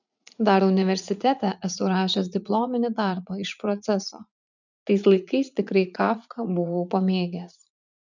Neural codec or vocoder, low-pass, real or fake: vocoder, 44.1 kHz, 80 mel bands, Vocos; 7.2 kHz; fake